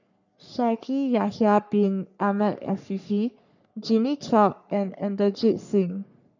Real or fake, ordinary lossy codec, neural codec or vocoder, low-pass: fake; none; codec, 44.1 kHz, 3.4 kbps, Pupu-Codec; 7.2 kHz